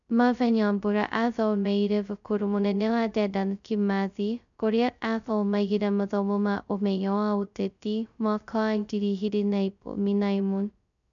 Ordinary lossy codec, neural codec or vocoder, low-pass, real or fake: none; codec, 16 kHz, 0.2 kbps, FocalCodec; 7.2 kHz; fake